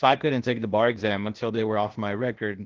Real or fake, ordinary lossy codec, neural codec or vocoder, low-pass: fake; Opus, 16 kbps; codec, 16 kHz, 0.8 kbps, ZipCodec; 7.2 kHz